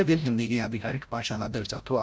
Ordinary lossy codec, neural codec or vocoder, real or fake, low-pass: none; codec, 16 kHz, 0.5 kbps, FreqCodec, larger model; fake; none